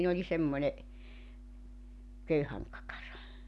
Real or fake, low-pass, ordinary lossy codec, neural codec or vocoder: real; none; none; none